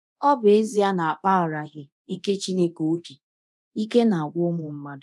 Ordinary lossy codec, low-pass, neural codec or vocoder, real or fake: none; none; codec, 24 kHz, 0.9 kbps, DualCodec; fake